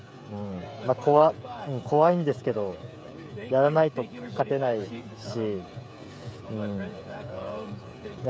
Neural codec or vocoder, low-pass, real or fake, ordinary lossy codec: codec, 16 kHz, 16 kbps, FreqCodec, smaller model; none; fake; none